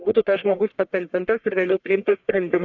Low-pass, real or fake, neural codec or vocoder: 7.2 kHz; fake; codec, 44.1 kHz, 1.7 kbps, Pupu-Codec